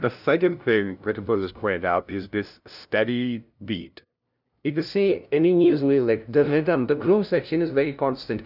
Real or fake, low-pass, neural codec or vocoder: fake; 5.4 kHz; codec, 16 kHz, 0.5 kbps, FunCodec, trained on LibriTTS, 25 frames a second